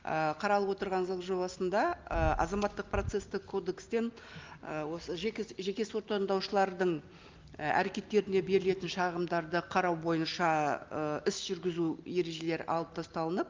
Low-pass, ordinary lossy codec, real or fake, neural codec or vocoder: 7.2 kHz; Opus, 32 kbps; real; none